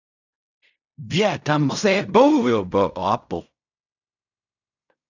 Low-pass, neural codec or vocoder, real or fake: 7.2 kHz; codec, 16 kHz in and 24 kHz out, 0.4 kbps, LongCat-Audio-Codec, fine tuned four codebook decoder; fake